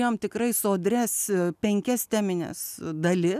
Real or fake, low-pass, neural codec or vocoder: real; 14.4 kHz; none